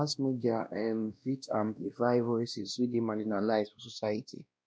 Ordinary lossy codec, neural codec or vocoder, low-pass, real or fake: none; codec, 16 kHz, 1 kbps, X-Codec, WavLM features, trained on Multilingual LibriSpeech; none; fake